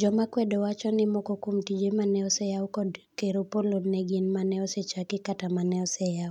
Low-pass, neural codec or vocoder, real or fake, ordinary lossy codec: 19.8 kHz; none; real; none